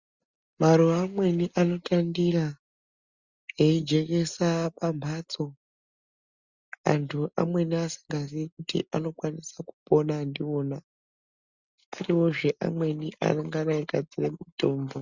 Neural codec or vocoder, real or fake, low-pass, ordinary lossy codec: none; real; 7.2 kHz; Opus, 64 kbps